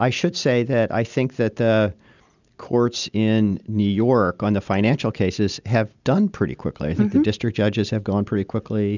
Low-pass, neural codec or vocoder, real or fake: 7.2 kHz; none; real